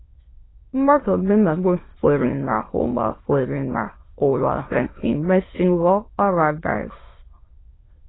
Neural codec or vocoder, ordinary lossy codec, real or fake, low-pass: autoencoder, 22.05 kHz, a latent of 192 numbers a frame, VITS, trained on many speakers; AAC, 16 kbps; fake; 7.2 kHz